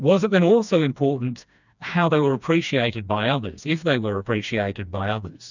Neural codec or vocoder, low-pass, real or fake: codec, 16 kHz, 2 kbps, FreqCodec, smaller model; 7.2 kHz; fake